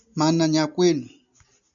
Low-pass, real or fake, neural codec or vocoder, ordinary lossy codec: 7.2 kHz; real; none; MP3, 96 kbps